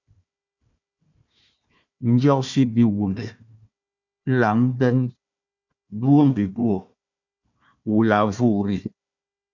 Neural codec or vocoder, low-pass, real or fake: codec, 16 kHz, 1 kbps, FunCodec, trained on Chinese and English, 50 frames a second; 7.2 kHz; fake